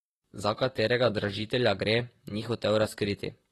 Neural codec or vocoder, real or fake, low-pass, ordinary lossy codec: vocoder, 44.1 kHz, 128 mel bands, Pupu-Vocoder; fake; 19.8 kHz; AAC, 32 kbps